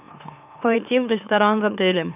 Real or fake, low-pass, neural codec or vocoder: fake; 3.6 kHz; autoencoder, 44.1 kHz, a latent of 192 numbers a frame, MeloTTS